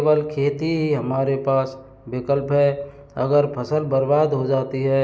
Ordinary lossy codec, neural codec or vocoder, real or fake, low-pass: none; none; real; none